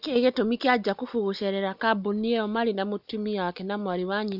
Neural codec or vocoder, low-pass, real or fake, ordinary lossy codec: none; 5.4 kHz; real; none